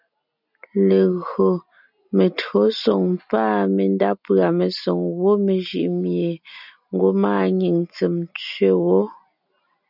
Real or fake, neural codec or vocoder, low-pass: real; none; 5.4 kHz